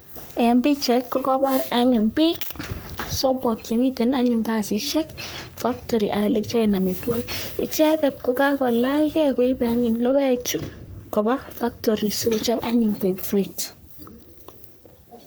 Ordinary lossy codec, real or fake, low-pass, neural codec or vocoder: none; fake; none; codec, 44.1 kHz, 3.4 kbps, Pupu-Codec